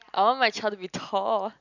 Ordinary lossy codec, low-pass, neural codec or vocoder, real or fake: none; 7.2 kHz; none; real